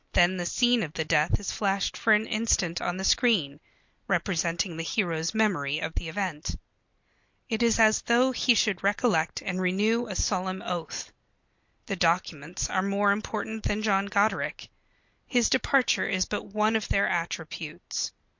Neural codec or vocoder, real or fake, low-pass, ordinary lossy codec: none; real; 7.2 kHz; MP3, 48 kbps